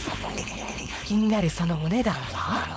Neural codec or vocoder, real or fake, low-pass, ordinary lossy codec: codec, 16 kHz, 4.8 kbps, FACodec; fake; none; none